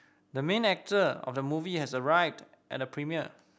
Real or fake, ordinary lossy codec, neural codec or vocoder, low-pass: real; none; none; none